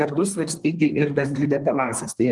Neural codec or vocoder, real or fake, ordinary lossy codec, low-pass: codec, 24 kHz, 1 kbps, SNAC; fake; Opus, 24 kbps; 10.8 kHz